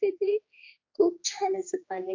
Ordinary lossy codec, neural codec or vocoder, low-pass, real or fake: AAC, 32 kbps; codec, 16 kHz, 2 kbps, X-Codec, HuBERT features, trained on general audio; 7.2 kHz; fake